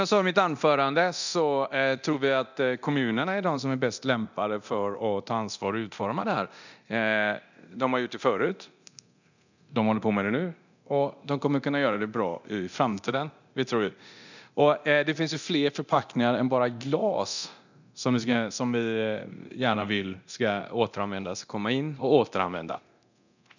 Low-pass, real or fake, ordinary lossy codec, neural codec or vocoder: 7.2 kHz; fake; none; codec, 24 kHz, 0.9 kbps, DualCodec